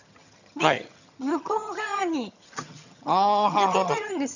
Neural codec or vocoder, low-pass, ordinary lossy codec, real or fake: vocoder, 22.05 kHz, 80 mel bands, HiFi-GAN; 7.2 kHz; none; fake